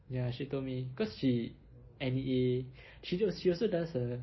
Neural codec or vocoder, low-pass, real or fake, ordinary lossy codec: none; 7.2 kHz; real; MP3, 24 kbps